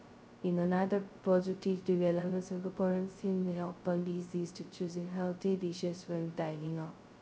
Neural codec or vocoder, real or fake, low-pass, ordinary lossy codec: codec, 16 kHz, 0.2 kbps, FocalCodec; fake; none; none